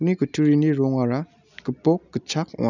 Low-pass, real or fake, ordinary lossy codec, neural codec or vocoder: 7.2 kHz; real; none; none